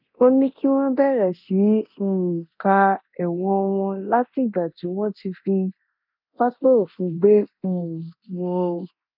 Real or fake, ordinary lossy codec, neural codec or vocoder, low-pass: fake; none; codec, 24 kHz, 0.9 kbps, DualCodec; 5.4 kHz